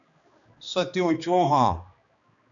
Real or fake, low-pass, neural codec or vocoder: fake; 7.2 kHz; codec, 16 kHz, 2 kbps, X-Codec, HuBERT features, trained on balanced general audio